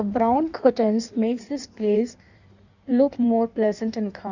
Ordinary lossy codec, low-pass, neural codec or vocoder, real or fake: none; 7.2 kHz; codec, 16 kHz in and 24 kHz out, 1.1 kbps, FireRedTTS-2 codec; fake